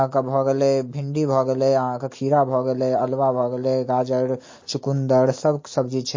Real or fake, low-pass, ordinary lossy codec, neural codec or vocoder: real; 7.2 kHz; MP3, 32 kbps; none